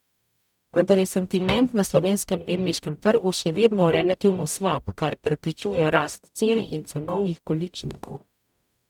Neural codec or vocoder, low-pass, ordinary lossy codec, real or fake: codec, 44.1 kHz, 0.9 kbps, DAC; 19.8 kHz; none; fake